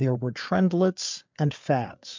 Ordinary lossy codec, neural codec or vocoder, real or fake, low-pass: MP3, 64 kbps; codec, 16 kHz, 4 kbps, FreqCodec, larger model; fake; 7.2 kHz